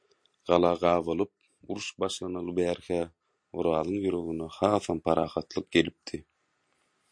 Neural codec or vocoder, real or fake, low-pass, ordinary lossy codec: none; real; 9.9 kHz; MP3, 48 kbps